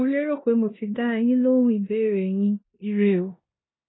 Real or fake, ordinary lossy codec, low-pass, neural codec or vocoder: fake; AAC, 16 kbps; 7.2 kHz; codec, 16 kHz in and 24 kHz out, 0.9 kbps, LongCat-Audio-Codec, four codebook decoder